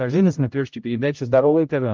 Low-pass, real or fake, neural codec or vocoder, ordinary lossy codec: 7.2 kHz; fake; codec, 16 kHz, 0.5 kbps, X-Codec, HuBERT features, trained on general audio; Opus, 32 kbps